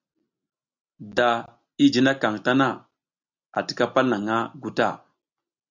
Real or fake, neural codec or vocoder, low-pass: real; none; 7.2 kHz